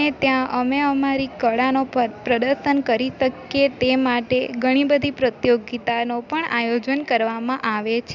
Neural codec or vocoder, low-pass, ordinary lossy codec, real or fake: none; 7.2 kHz; none; real